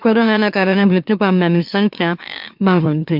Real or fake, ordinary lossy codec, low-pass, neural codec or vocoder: fake; MP3, 48 kbps; 5.4 kHz; autoencoder, 44.1 kHz, a latent of 192 numbers a frame, MeloTTS